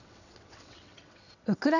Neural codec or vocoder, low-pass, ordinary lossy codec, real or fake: vocoder, 22.05 kHz, 80 mel bands, WaveNeXt; 7.2 kHz; none; fake